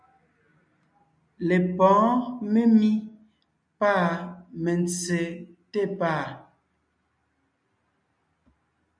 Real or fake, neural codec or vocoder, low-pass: real; none; 9.9 kHz